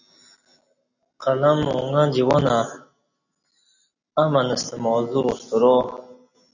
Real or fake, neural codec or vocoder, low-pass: real; none; 7.2 kHz